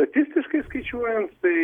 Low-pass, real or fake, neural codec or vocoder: 19.8 kHz; real; none